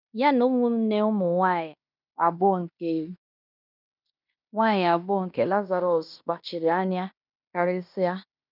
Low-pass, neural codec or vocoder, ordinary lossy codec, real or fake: 5.4 kHz; codec, 16 kHz in and 24 kHz out, 0.9 kbps, LongCat-Audio-Codec, fine tuned four codebook decoder; none; fake